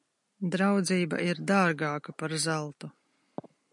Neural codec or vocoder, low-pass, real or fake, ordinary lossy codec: none; 10.8 kHz; real; MP3, 96 kbps